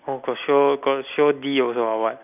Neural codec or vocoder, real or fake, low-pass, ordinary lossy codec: none; real; 3.6 kHz; none